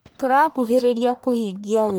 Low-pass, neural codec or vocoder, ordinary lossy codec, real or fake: none; codec, 44.1 kHz, 1.7 kbps, Pupu-Codec; none; fake